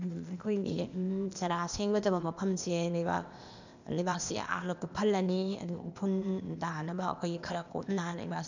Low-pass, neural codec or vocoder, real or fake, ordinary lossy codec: 7.2 kHz; codec, 16 kHz, 0.8 kbps, ZipCodec; fake; none